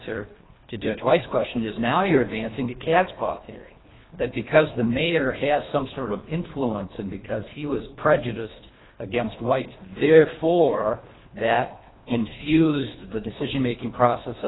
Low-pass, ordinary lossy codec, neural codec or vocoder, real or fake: 7.2 kHz; AAC, 16 kbps; codec, 24 kHz, 1.5 kbps, HILCodec; fake